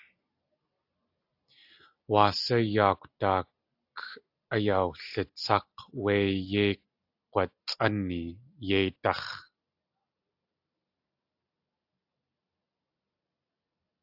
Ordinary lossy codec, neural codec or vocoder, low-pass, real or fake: AAC, 48 kbps; none; 5.4 kHz; real